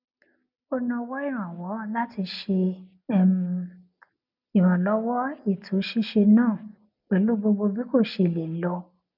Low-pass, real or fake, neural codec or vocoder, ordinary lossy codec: 5.4 kHz; real; none; none